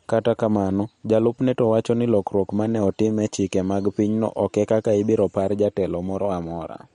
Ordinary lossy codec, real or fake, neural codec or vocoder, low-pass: MP3, 48 kbps; real; none; 10.8 kHz